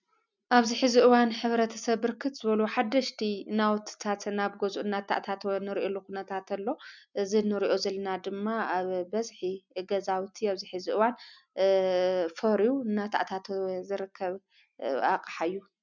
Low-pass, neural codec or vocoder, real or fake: 7.2 kHz; none; real